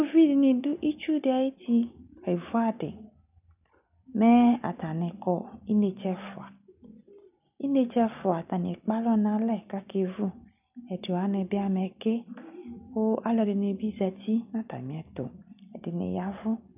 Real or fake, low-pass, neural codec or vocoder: real; 3.6 kHz; none